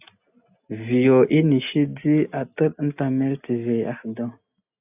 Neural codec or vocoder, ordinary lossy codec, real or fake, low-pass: none; AAC, 32 kbps; real; 3.6 kHz